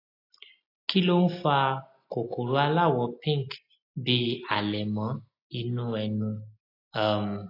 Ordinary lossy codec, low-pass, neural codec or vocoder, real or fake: AAC, 32 kbps; 5.4 kHz; none; real